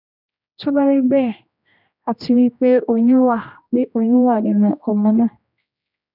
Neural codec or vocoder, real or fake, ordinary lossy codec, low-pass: codec, 16 kHz, 1 kbps, X-Codec, HuBERT features, trained on general audio; fake; none; 5.4 kHz